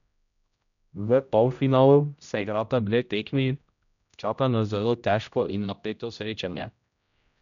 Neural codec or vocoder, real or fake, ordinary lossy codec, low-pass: codec, 16 kHz, 0.5 kbps, X-Codec, HuBERT features, trained on general audio; fake; none; 7.2 kHz